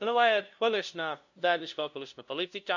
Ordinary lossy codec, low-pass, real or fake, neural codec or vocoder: none; 7.2 kHz; fake; codec, 16 kHz, 0.5 kbps, FunCodec, trained on LibriTTS, 25 frames a second